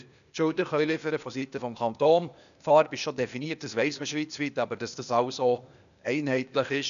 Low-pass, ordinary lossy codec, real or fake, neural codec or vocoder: 7.2 kHz; none; fake; codec, 16 kHz, 0.8 kbps, ZipCodec